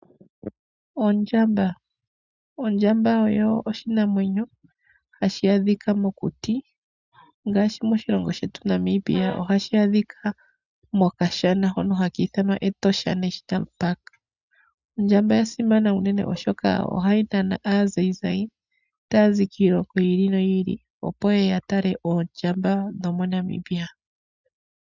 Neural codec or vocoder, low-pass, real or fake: none; 7.2 kHz; real